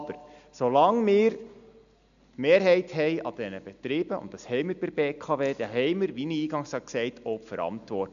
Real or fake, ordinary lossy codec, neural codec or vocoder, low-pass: real; none; none; 7.2 kHz